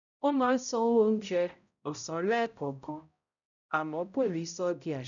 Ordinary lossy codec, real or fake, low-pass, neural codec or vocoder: none; fake; 7.2 kHz; codec, 16 kHz, 0.5 kbps, X-Codec, HuBERT features, trained on balanced general audio